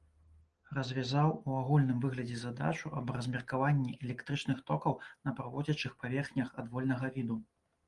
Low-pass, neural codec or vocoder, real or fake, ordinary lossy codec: 10.8 kHz; none; real; Opus, 24 kbps